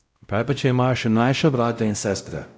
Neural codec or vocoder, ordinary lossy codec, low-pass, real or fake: codec, 16 kHz, 0.5 kbps, X-Codec, WavLM features, trained on Multilingual LibriSpeech; none; none; fake